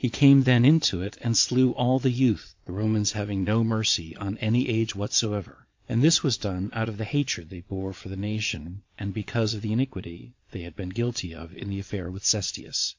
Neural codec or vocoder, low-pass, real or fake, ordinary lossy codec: vocoder, 22.05 kHz, 80 mel bands, Vocos; 7.2 kHz; fake; MP3, 48 kbps